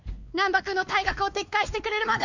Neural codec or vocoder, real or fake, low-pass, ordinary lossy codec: codec, 16 kHz, 4 kbps, X-Codec, WavLM features, trained on Multilingual LibriSpeech; fake; 7.2 kHz; MP3, 64 kbps